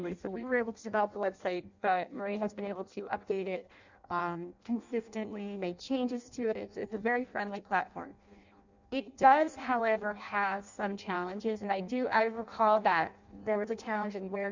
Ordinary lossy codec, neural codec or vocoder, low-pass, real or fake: Opus, 64 kbps; codec, 16 kHz in and 24 kHz out, 0.6 kbps, FireRedTTS-2 codec; 7.2 kHz; fake